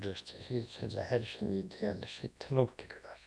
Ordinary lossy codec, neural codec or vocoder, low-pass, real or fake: none; codec, 24 kHz, 0.9 kbps, WavTokenizer, large speech release; none; fake